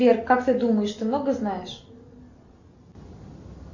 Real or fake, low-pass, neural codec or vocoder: real; 7.2 kHz; none